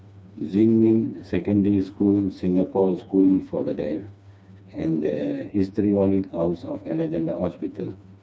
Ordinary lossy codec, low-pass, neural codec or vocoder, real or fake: none; none; codec, 16 kHz, 2 kbps, FreqCodec, smaller model; fake